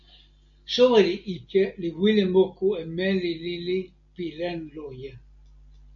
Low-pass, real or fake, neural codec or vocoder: 7.2 kHz; real; none